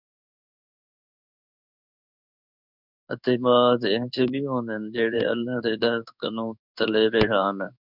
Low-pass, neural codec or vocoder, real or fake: 5.4 kHz; codec, 16 kHz in and 24 kHz out, 1 kbps, XY-Tokenizer; fake